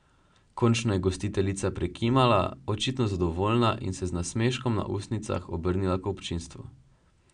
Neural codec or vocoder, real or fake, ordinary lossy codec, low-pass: none; real; none; 9.9 kHz